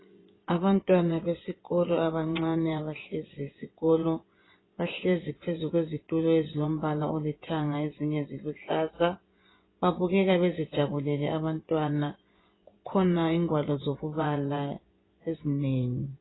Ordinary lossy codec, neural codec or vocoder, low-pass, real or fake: AAC, 16 kbps; vocoder, 24 kHz, 100 mel bands, Vocos; 7.2 kHz; fake